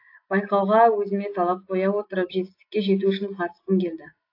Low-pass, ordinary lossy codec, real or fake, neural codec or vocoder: 5.4 kHz; AAC, 32 kbps; real; none